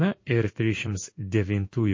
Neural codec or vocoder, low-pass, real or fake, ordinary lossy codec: codec, 16 kHz in and 24 kHz out, 1 kbps, XY-Tokenizer; 7.2 kHz; fake; MP3, 32 kbps